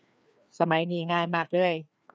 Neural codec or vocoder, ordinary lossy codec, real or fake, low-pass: codec, 16 kHz, 2 kbps, FreqCodec, larger model; none; fake; none